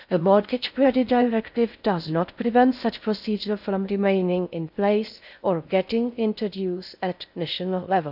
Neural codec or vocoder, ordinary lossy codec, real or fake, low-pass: codec, 16 kHz in and 24 kHz out, 0.6 kbps, FocalCodec, streaming, 4096 codes; none; fake; 5.4 kHz